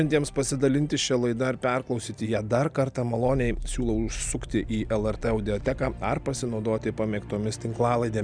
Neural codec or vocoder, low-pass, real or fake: none; 9.9 kHz; real